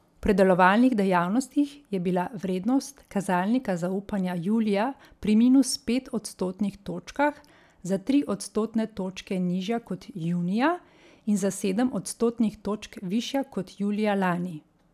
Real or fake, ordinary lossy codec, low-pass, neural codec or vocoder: real; none; 14.4 kHz; none